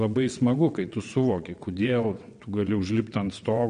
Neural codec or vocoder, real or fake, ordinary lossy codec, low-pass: vocoder, 22.05 kHz, 80 mel bands, WaveNeXt; fake; MP3, 48 kbps; 9.9 kHz